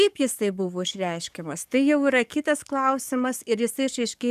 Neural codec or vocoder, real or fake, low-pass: codec, 44.1 kHz, 7.8 kbps, DAC; fake; 14.4 kHz